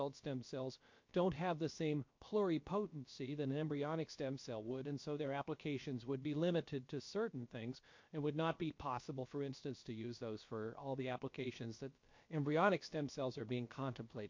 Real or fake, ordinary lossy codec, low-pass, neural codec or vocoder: fake; MP3, 48 kbps; 7.2 kHz; codec, 16 kHz, about 1 kbps, DyCAST, with the encoder's durations